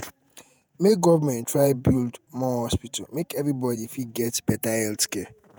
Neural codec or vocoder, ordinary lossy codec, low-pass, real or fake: vocoder, 48 kHz, 128 mel bands, Vocos; none; none; fake